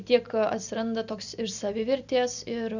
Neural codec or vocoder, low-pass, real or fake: none; 7.2 kHz; real